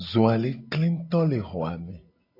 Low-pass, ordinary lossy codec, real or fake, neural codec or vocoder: 5.4 kHz; AAC, 32 kbps; real; none